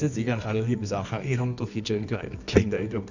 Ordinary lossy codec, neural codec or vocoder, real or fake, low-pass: none; codec, 24 kHz, 0.9 kbps, WavTokenizer, medium music audio release; fake; 7.2 kHz